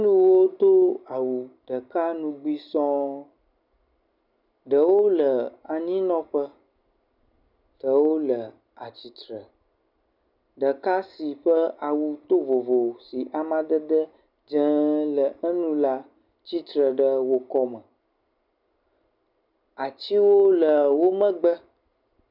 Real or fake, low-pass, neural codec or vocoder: real; 5.4 kHz; none